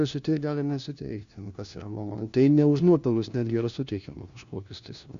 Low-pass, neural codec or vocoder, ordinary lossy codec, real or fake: 7.2 kHz; codec, 16 kHz, 1 kbps, FunCodec, trained on LibriTTS, 50 frames a second; Opus, 64 kbps; fake